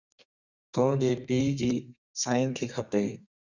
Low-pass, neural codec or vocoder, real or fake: 7.2 kHz; codec, 32 kHz, 1.9 kbps, SNAC; fake